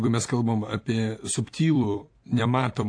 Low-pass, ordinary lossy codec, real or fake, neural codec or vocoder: 9.9 kHz; AAC, 48 kbps; fake; vocoder, 44.1 kHz, 128 mel bands every 256 samples, BigVGAN v2